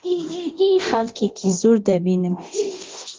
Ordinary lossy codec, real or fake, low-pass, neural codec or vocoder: Opus, 16 kbps; fake; 7.2 kHz; codec, 24 kHz, 0.9 kbps, DualCodec